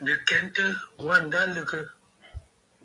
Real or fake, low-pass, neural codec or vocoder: fake; 10.8 kHz; vocoder, 24 kHz, 100 mel bands, Vocos